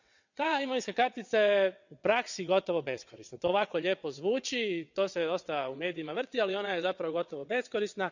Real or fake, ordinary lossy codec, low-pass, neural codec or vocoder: fake; none; 7.2 kHz; vocoder, 22.05 kHz, 80 mel bands, WaveNeXt